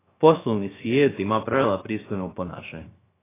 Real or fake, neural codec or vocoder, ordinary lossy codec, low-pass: fake; codec, 16 kHz, 0.3 kbps, FocalCodec; AAC, 16 kbps; 3.6 kHz